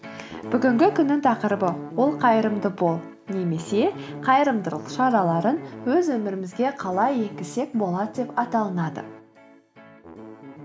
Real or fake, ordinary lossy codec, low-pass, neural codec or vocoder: real; none; none; none